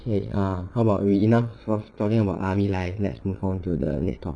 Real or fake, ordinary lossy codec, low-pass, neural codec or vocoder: fake; none; 9.9 kHz; vocoder, 22.05 kHz, 80 mel bands, WaveNeXt